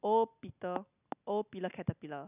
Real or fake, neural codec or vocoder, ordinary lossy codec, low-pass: real; none; none; 3.6 kHz